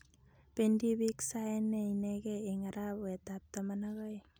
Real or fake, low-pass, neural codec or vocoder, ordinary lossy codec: real; none; none; none